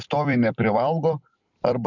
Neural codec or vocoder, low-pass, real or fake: vocoder, 44.1 kHz, 128 mel bands every 256 samples, BigVGAN v2; 7.2 kHz; fake